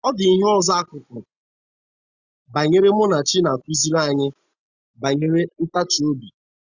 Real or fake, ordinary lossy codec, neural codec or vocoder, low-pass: real; Opus, 64 kbps; none; 7.2 kHz